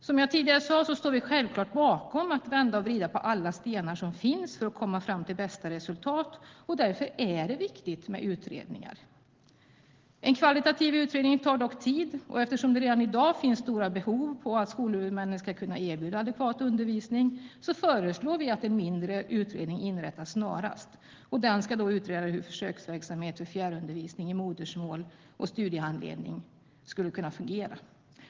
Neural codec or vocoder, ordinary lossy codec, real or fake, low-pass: none; Opus, 16 kbps; real; 7.2 kHz